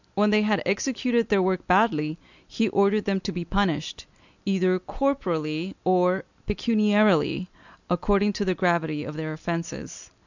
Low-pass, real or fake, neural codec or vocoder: 7.2 kHz; real; none